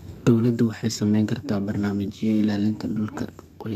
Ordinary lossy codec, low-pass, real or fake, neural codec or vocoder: none; 14.4 kHz; fake; codec, 32 kHz, 1.9 kbps, SNAC